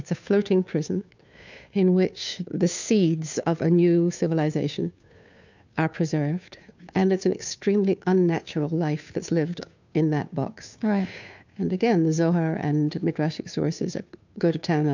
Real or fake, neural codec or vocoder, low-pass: fake; codec, 16 kHz, 2 kbps, FunCodec, trained on Chinese and English, 25 frames a second; 7.2 kHz